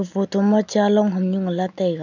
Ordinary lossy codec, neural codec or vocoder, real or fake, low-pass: none; none; real; 7.2 kHz